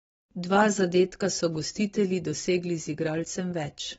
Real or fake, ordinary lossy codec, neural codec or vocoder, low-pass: fake; AAC, 24 kbps; vocoder, 44.1 kHz, 128 mel bands every 256 samples, BigVGAN v2; 19.8 kHz